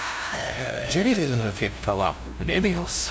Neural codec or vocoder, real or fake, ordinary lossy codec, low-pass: codec, 16 kHz, 0.5 kbps, FunCodec, trained on LibriTTS, 25 frames a second; fake; none; none